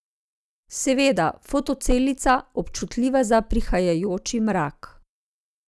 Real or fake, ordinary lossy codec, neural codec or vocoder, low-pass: real; none; none; none